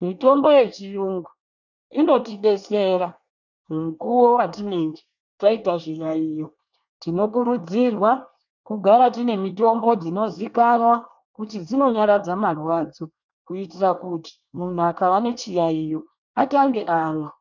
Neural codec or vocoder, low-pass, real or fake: codec, 24 kHz, 1 kbps, SNAC; 7.2 kHz; fake